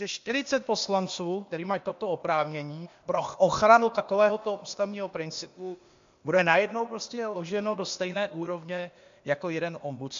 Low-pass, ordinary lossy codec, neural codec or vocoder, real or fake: 7.2 kHz; MP3, 48 kbps; codec, 16 kHz, 0.8 kbps, ZipCodec; fake